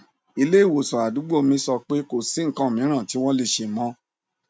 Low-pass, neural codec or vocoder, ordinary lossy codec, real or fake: none; none; none; real